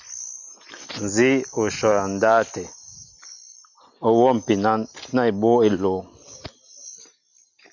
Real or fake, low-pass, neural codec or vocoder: real; 7.2 kHz; none